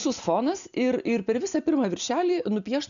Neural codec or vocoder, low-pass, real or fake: none; 7.2 kHz; real